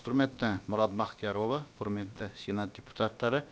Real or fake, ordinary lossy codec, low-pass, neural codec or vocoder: fake; none; none; codec, 16 kHz, about 1 kbps, DyCAST, with the encoder's durations